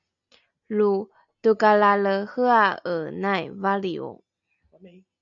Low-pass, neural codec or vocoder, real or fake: 7.2 kHz; none; real